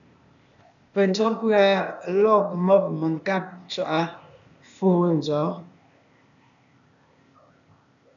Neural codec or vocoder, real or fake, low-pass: codec, 16 kHz, 0.8 kbps, ZipCodec; fake; 7.2 kHz